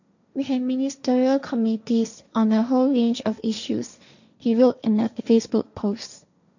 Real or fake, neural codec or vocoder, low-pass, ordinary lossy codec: fake; codec, 16 kHz, 1.1 kbps, Voila-Tokenizer; 7.2 kHz; none